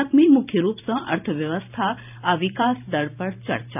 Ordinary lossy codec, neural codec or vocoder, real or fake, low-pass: none; vocoder, 44.1 kHz, 128 mel bands every 512 samples, BigVGAN v2; fake; 3.6 kHz